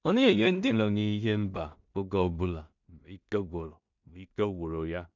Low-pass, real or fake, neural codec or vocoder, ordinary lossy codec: 7.2 kHz; fake; codec, 16 kHz in and 24 kHz out, 0.4 kbps, LongCat-Audio-Codec, two codebook decoder; none